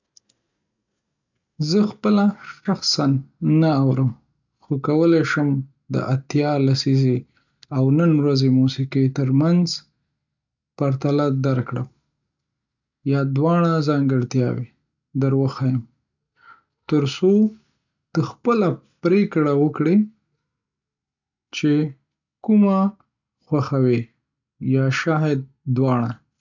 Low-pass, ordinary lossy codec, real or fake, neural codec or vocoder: 7.2 kHz; none; real; none